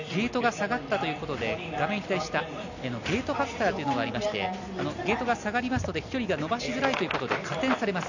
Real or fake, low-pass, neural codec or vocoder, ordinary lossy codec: real; 7.2 kHz; none; none